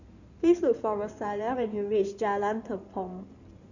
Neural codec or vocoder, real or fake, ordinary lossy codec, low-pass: codec, 16 kHz in and 24 kHz out, 2.2 kbps, FireRedTTS-2 codec; fake; none; 7.2 kHz